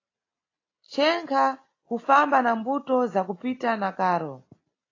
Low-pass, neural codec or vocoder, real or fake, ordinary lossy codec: 7.2 kHz; none; real; AAC, 32 kbps